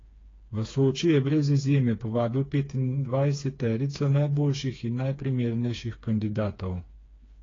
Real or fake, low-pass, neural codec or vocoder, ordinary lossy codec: fake; 7.2 kHz; codec, 16 kHz, 4 kbps, FreqCodec, smaller model; AAC, 32 kbps